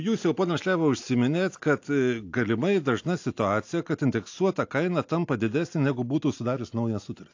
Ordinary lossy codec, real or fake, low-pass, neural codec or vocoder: AAC, 48 kbps; real; 7.2 kHz; none